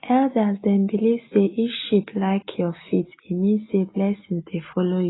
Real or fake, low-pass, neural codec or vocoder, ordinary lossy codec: real; 7.2 kHz; none; AAC, 16 kbps